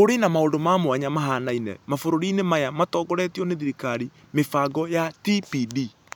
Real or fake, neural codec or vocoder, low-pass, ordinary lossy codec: real; none; none; none